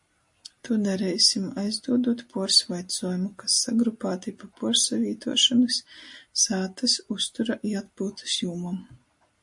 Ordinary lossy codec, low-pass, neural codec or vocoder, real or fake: MP3, 48 kbps; 10.8 kHz; none; real